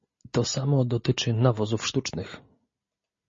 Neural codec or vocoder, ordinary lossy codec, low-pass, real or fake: none; MP3, 32 kbps; 7.2 kHz; real